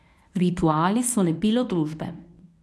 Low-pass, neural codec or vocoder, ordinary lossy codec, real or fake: none; codec, 24 kHz, 0.9 kbps, WavTokenizer, medium speech release version 1; none; fake